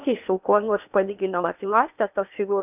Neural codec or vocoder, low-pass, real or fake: codec, 16 kHz in and 24 kHz out, 0.8 kbps, FocalCodec, streaming, 65536 codes; 3.6 kHz; fake